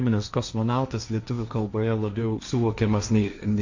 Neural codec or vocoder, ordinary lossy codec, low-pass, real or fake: codec, 16 kHz, 1.1 kbps, Voila-Tokenizer; Opus, 64 kbps; 7.2 kHz; fake